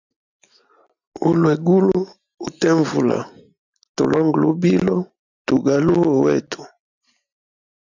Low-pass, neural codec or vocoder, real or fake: 7.2 kHz; none; real